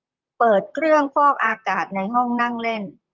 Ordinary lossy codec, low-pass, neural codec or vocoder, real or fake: Opus, 24 kbps; 7.2 kHz; vocoder, 44.1 kHz, 128 mel bands, Pupu-Vocoder; fake